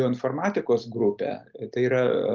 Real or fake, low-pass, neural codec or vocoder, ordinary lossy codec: real; 7.2 kHz; none; Opus, 32 kbps